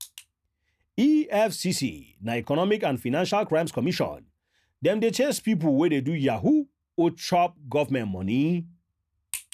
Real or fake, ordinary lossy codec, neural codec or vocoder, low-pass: real; none; none; 14.4 kHz